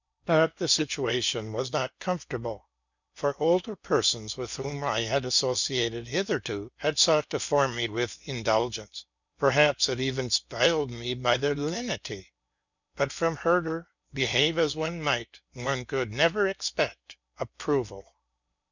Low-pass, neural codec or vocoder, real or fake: 7.2 kHz; codec, 16 kHz in and 24 kHz out, 0.8 kbps, FocalCodec, streaming, 65536 codes; fake